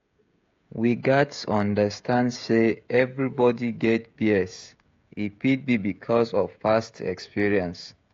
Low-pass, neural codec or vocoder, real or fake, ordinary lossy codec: 7.2 kHz; codec, 16 kHz, 16 kbps, FreqCodec, smaller model; fake; AAC, 48 kbps